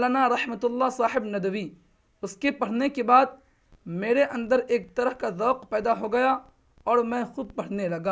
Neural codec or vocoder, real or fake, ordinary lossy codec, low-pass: none; real; none; none